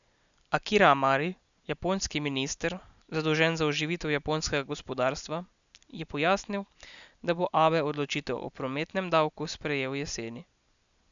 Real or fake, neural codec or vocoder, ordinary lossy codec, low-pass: real; none; none; 7.2 kHz